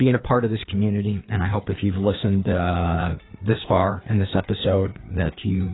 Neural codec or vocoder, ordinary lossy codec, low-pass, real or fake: codec, 16 kHz, 4 kbps, FreqCodec, larger model; AAC, 16 kbps; 7.2 kHz; fake